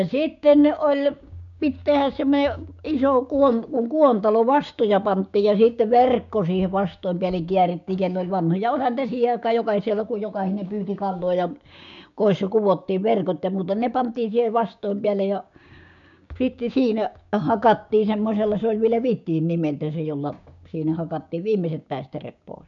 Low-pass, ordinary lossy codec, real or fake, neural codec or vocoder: 7.2 kHz; none; fake; codec, 16 kHz, 6 kbps, DAC